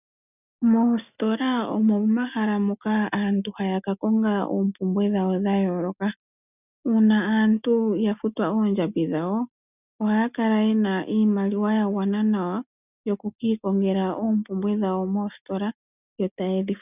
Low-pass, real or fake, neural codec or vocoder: 3.6 kHz; real; none